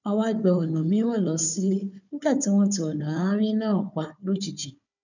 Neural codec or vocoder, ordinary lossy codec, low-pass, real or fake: codec, 16 kHz, 4 kbps, FunCodec, trained on Chinese and English, 50 frames a second; none; 7.2 kHz; fake